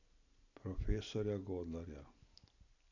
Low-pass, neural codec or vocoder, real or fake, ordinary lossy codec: 7.2 kHz; none; real; none